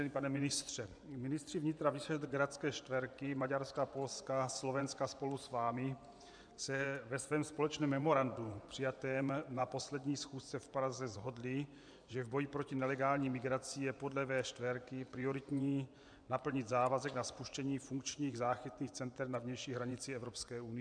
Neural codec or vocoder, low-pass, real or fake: vocoder, 24 kHz, 100 mel bands, Vocos; 9.9 kHz; fake